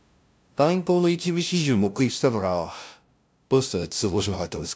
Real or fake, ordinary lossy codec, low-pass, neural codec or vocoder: fake; none; none; codec, 16 kHz, 0.5 kbps, FunCodec, trained on LibriTTS, 25 frames a second